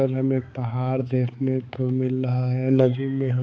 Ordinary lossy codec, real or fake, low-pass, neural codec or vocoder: none; fake; none; codec, 16 kHz, 4 kbps, X-Codec, HuBERT features, trained on balanced general audio